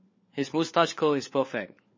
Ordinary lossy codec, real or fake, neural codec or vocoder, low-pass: MP3, 32 kbps; fake; codec, 16 kHz, 4 kbps, FunCodec, trained on LibriTTS, 50 frames a second; 7.2 kHz